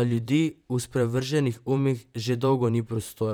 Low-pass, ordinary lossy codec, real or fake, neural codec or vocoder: none; none; fake; vocoder, 44.1 kHz, 128 mel bands, Pupu-Vocoder